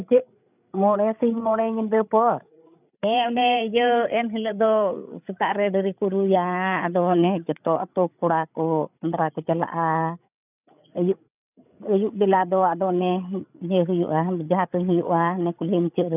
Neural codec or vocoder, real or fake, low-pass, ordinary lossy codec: codec, 16 kHz, 8 kbps, FreqCodec, larger model; fake; 3.6 kHz; none